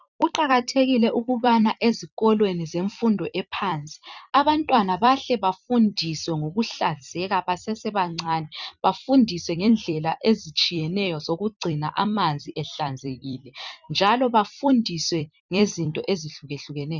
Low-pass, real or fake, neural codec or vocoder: 7.2 kHz; fake; vocoder, 44.1 kHz, 128 mel bands every 256 samples, BigVGAN v2